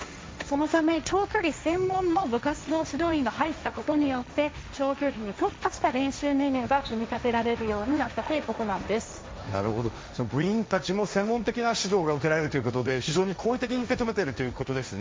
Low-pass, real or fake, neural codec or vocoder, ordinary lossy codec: none; fake; codec, 16 kHz, 1.1 kbps, Voila-Tokenizer; none